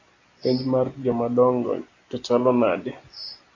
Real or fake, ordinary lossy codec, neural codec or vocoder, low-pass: real; AAC, 32 kbps; none; 7.2 kHz